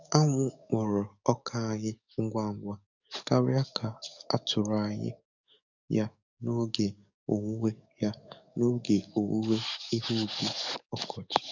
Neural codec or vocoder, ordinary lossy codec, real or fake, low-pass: codec, 44.1 kHz, 7.8 kbps, DAC; none; fake; 7.2 kHz